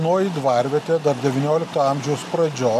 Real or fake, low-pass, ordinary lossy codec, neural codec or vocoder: real; 14.4 kHz; MP3, 96 kbps; none